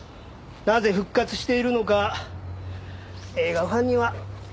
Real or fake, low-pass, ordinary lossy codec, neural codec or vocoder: real; none; none; none